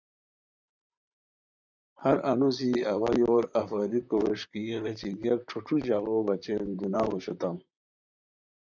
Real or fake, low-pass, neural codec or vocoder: fake; 7.2 kHz; vocoder, 44.1 kHz, 128 mel bands, Pupu-Vocoder